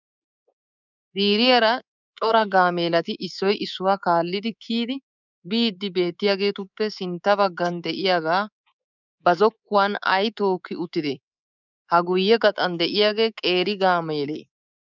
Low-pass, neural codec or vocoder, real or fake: 7.2 kHz; codec, 24 kHz, 3.1 kbps, DualCodec; fake